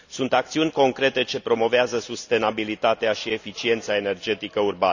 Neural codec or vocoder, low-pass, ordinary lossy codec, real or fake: none; 7.2 kHz; none; real